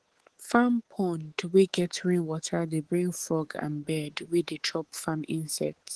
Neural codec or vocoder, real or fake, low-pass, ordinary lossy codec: codec, 24 kHz, 3.1 kbps, DualCodec; fake; 10.8 kHz; Opus, 16 kbps